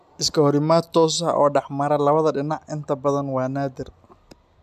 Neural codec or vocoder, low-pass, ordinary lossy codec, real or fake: none; 14.4 kHz; MP3, 96 kbps; real